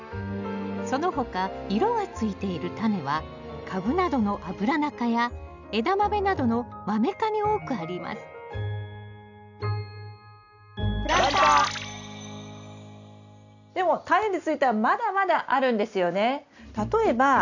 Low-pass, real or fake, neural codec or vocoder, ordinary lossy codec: 7.2 kHz; real; none; none